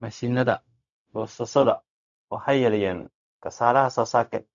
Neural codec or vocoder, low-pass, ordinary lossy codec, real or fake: codec, 16 kHz, 0.4 kbps, LongCat-Audio-Codec; 7.2 kHz; none; fake